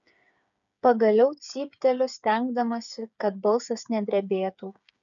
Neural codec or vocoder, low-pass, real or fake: codec, 16 kHz, 8 kbps, FreqCodec, smaller model; 7.2 kHz; fake